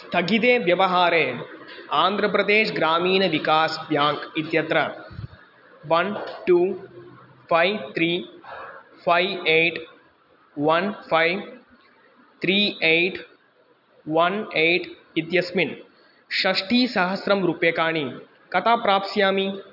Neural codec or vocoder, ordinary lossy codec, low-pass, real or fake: none; none; 5.4 kHz; real